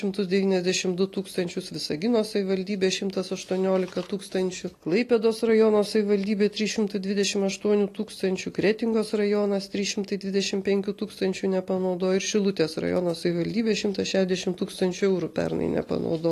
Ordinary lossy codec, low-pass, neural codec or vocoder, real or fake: AAC, 48 kbps; 14.4 kHz; none; real